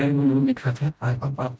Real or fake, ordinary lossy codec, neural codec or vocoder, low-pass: fake; none; codec, 16 kHz, 0.5 kbps, FreqCodec, smaller model; none